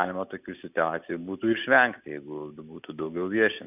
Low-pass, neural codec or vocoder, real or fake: 3.6 kHz; none; real